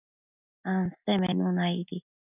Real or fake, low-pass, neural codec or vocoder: real; 3.6 kHz; none